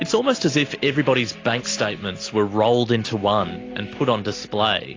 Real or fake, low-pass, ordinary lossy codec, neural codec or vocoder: real; 7.2 kHz; AAC, 32 kbps; none